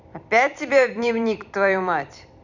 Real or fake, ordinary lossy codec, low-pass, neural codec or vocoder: fake; none; 7.2 kHz; vocoder, 44.1 kHz, 128 mel bands every 256 samples, BigVGAN v2